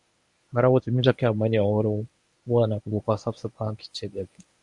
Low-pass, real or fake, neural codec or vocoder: 10.8 kHz; fake; codec, 24 kHz, 0.9 kbps, WavTokenizer, medium speech release version 1